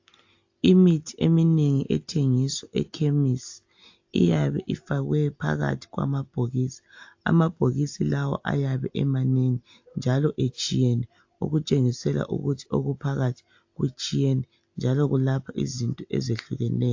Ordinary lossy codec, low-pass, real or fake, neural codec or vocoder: AAC, 48 kbps; 7.2 kHz; real; none